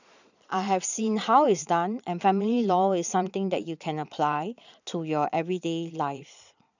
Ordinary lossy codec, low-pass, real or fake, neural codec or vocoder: none; 7.2 kHz; fake; vocoder, 22.05 kHz, 80 mel bands, Vocos